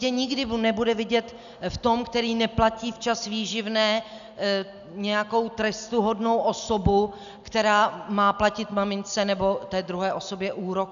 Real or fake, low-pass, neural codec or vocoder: real; 7.2 kHz; none